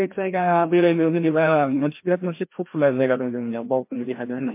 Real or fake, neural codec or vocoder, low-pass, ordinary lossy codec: fake; codec, 16 kHz, 1 kbps, FreqCodec, larger model; 3.6 kHz; MP3, 24 kbps